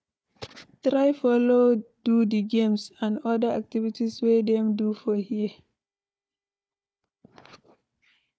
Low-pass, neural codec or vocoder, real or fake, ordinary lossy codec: none; codec, 16 kHz, 4 kbps, FunCodec, trained on Chinese and English, 50 frames a second; fake; none